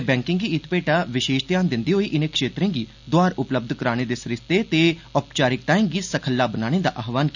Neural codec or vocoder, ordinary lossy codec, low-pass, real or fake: none; none; 7.2 kHz; real